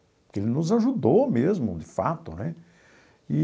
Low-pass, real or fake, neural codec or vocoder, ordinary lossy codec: none; real; none; none